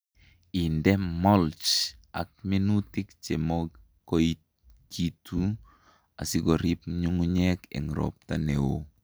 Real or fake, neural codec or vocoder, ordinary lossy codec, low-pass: real; none; none; none